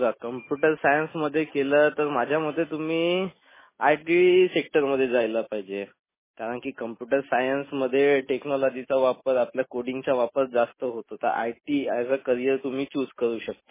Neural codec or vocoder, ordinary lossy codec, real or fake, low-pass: none; MP3, 16 kbps; real; 3.6 kHz